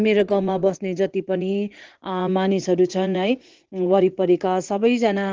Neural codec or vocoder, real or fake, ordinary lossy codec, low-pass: vocoder, 44.1 kHz, 80 mel bands, Vocos; fake; Opus, 16 kbps; 7.2 kHz